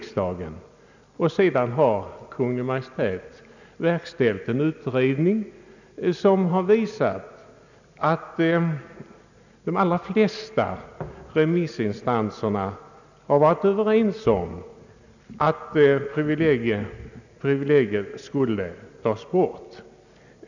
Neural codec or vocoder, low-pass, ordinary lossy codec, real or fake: none; 7.2 kHz; none; real